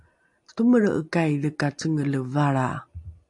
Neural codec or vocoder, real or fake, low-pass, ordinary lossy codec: none; real; 10.8 kHz; AAC, 64 kbps